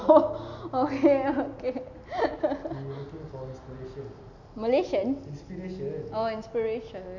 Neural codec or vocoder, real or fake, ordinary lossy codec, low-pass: none; real; none; 7.2 kHz